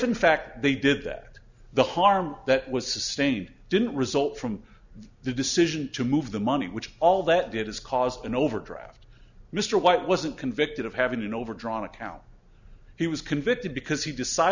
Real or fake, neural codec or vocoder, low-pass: real; none; 7.2 kHz